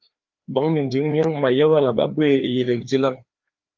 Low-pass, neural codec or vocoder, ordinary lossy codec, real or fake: 7.2 kHz; codec, 16 kHz, 2 kbps, FreqCodec, larger model; Opus, 32 kbps; fake